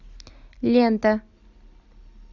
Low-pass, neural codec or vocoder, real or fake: 7.2 kHz; none; real